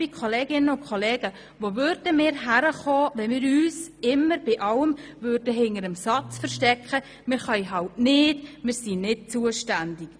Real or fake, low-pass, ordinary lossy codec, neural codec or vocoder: real; none; none; none